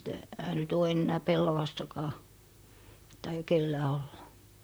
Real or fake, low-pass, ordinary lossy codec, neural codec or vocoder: fake; none; none; vocoder, 44.1 kHz, 128 mel bands, Pupu-Vocoder